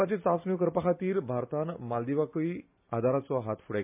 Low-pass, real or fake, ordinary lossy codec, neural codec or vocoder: 3.6 kHz; real; MP3, 32 kbps; none